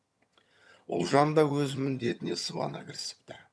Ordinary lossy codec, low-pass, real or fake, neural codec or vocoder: none; none; fake; vocoder, 22.05 kHz, 80 mel bands, HiFi-GAN